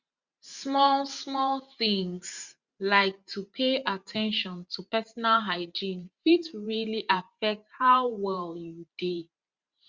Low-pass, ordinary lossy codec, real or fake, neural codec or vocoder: 7.2 kHz; Opus, 64 kbps; fake; vocoder, 44.1 kHz, 128 mel bands every 512 samples, BigVGAN v2